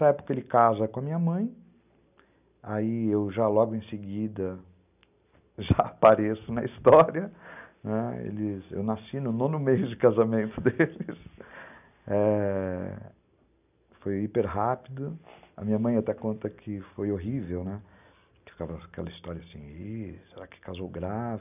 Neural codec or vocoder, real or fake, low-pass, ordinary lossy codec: none; real; 3.6 kHz; none